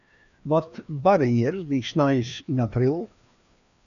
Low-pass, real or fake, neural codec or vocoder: 7.2 kHz; fake; codec, 16 kHz, 2 kbps, FreqCodec, larger model